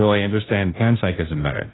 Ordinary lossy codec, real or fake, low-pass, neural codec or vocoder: AAC, 16 kbps; fake; 7.2 kHz; codec, 16 kHz, 0.5 kbps, FunCodec, trained on Chinese and English, 25 frames a second